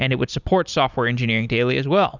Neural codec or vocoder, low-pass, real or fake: none; 7.2 kHz; real